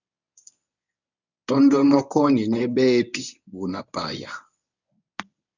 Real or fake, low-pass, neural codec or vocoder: fake; 7.2 kHz; codec, 24 kHz, 0.9 kbps, WavTokenizer, medium speech release version 1